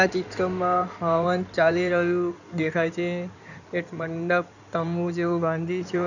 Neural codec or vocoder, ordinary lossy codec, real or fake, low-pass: codec, 16 kHz in and 24 kHz out, 2.2 kbps, FireRedTTS-2 codec; none; fake; 7.2 kHz